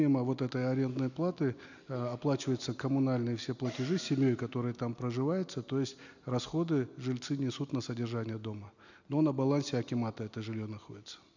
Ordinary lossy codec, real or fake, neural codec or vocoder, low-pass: none; real; none; 7.2 kHz